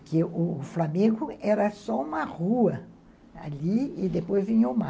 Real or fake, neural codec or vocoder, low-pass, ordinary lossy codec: real; none; none; none